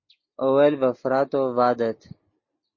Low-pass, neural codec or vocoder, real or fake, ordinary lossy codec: 7.2 kHz; none; real; MP3, 32 kbps